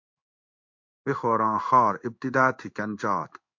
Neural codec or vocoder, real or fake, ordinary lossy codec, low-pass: codec, 16 kHz in and 24 kHz out, 1 kbps, XY-Tokenizer; fake; MP3, 48 kbps; 7.2 kHz